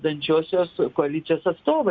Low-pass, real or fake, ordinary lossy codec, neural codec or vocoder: 7.2 kHz; real; Opus, 64 kbps; none